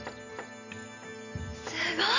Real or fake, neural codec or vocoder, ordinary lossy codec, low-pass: real; none; none; 7.2 kHz